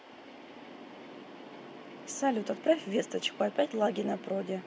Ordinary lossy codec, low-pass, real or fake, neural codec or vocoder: none; none; real; none